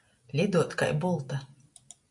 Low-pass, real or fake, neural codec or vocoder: 10.8 kHz; real; none